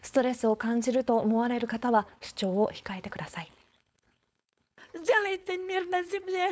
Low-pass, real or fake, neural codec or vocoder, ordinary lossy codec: none; fake; codec, 16 kHz, 4.8 kbps, FACodec; none